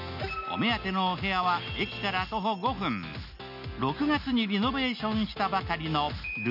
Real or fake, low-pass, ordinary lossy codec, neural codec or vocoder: real; 5.4 kHz; none; none